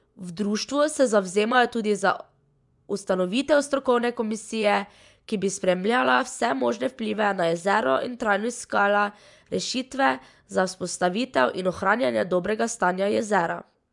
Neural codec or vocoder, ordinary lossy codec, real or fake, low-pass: vocoder, 24 kHz, 100 mel bands, Vocos; none; fake; 10.8 kHz